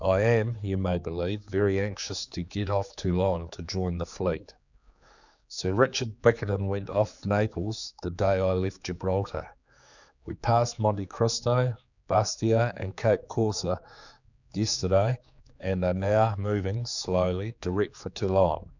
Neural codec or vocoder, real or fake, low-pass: codec, 16 kHz, 4 kbps, X-Codec, HuBERT features, trained on general audio; fake; 7.2 kHz